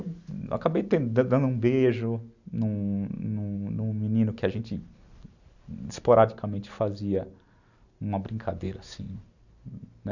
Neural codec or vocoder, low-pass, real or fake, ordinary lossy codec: none; 7.2 kHz; real; none